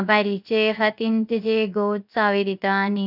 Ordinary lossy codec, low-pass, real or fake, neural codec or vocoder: none; 5.4 kHz; fake; codec, 16 kHz, 0.3 kbps, FocalCodec